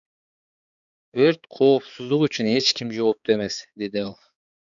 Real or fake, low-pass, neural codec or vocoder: fake; 7.2 kHz; codec, 16 kHz, 4 kbps, X-Codec, HuBERT features, trained on balanced general audio